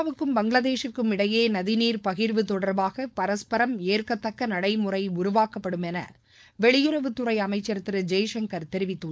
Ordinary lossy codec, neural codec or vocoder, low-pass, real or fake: none; codec, 16 kHz, 4.8 kbps, FACodec; none; fake